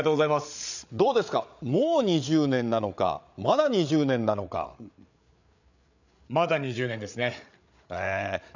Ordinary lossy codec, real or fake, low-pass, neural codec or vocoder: none; fake; 7.2 kHz; codec, 16 kHz, 8 kbps, FreqCodec, larger model